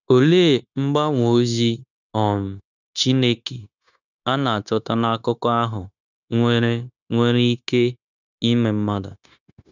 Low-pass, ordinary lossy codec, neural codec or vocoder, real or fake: 7.2 kHz; none; codec, 16 kHz, 0.9 kbps, LongCat-Audio-Codec; fake